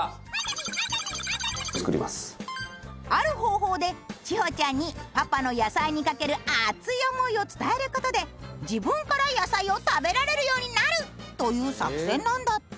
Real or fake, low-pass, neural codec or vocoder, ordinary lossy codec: real; none; none; none